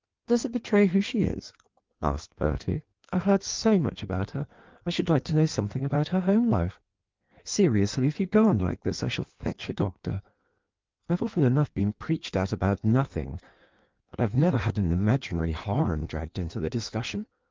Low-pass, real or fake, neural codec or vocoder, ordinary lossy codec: 7.2 kHz; fake; codec, 16 kHz in and 24 kHz out, 1.1 kbps, FireRedTTS-2 codec; Opus, 32 kbps